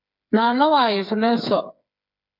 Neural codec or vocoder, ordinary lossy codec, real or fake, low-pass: codec, 16 kHz, 4 kbps, FreqCodec, smaller model; MP3, 48 kbps; fake; 5.4 kHz